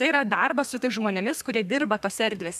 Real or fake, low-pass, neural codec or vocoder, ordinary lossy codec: fake; 14.4 kHz; codec, 32 kHz, 1.9 kbps, SNAC; AAC, 96 kbps